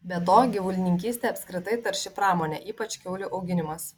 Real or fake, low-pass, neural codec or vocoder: real; 19.8 kHz; none